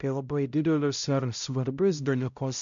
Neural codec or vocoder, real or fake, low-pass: codec, 16 kHz, 0.5 kbps, X-Codec, HuBERT features, trained on balanced general audio; fake; 7.2 kHz